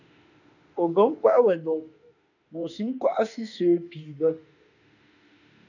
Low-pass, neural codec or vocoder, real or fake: 7.2 kHz; autoencoder, 48 kHz, 32 numbers a frame, DAC-VAE, trained on Japanese speech; fake